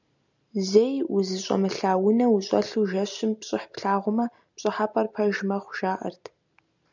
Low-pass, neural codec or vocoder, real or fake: 7.2 kHz; none; real